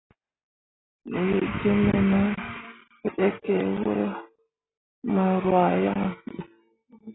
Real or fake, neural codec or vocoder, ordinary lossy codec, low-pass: real; none; AAC, 16 kbps; 7.2 kHz